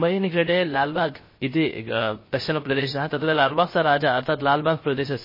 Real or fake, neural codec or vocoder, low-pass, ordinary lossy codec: fake; codec, 16 kHz, 0.3 kbps, FocalCodec; 5.4 kHz; MP3, 24 kbps